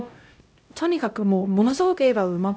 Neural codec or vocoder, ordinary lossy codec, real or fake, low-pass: codec, 16 kHz, 0.5 kbps, X-Codec, HuBERT features, trained on LibriSpeech; none; fake; none